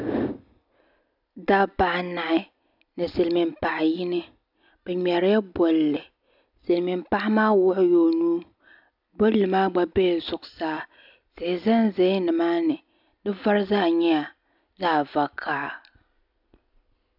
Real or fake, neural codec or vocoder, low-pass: real; none; 5.4 kHz